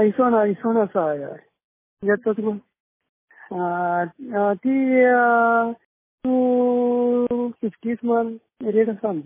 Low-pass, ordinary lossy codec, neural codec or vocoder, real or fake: 3.6 kHz; MP3, 16 kbps; none; real